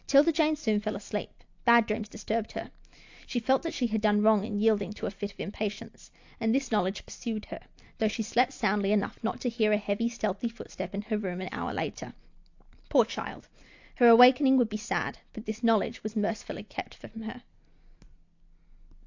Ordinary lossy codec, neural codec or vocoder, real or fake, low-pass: AAC, 48 kbps; none; real; 7.2 kHz